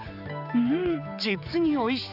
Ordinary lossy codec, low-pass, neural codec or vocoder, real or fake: none; 5.4 kHz; codec, 16 kHz, 4 kbps, X-Codec, HuBERT features, trained on general audio; fake